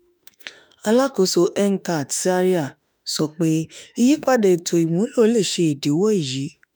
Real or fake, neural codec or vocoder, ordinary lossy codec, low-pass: fake; autoencoder, 48 kHz, 32 numbers a frame, DAC-VAE, trained on Japanese speech; none; none